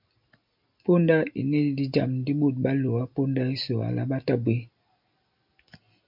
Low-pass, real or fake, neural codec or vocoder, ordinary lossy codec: 5.4 kHz; real; none; MP3, 48 kbps